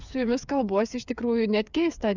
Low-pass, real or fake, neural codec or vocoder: 7.2 kHz; fake; codec, 16 kHz, 8 kbps, FreqCodec, smaller model